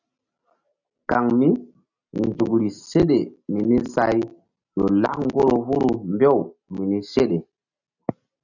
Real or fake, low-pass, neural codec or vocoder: real; 7.2 kHz; none